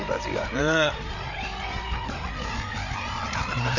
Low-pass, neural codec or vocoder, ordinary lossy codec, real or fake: 7.2 kHz; codec, 16 kHz, 8 kbps, FreqCodec, larger model; none; fake